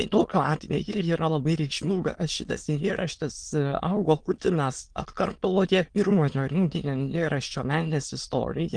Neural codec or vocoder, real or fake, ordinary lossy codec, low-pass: autoencoder, 22.05 kHz, a latent of 192 numbers a frame, VITS, trained on many speakers; fake; Opus, 24 kbps; 9.9 kHz